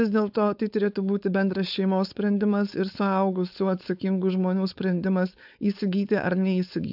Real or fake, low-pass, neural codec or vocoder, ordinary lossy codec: fake; 5.4 kHz; codec, 16 kHz, 4.8 kbps, FACodec; AAC, 48 kbps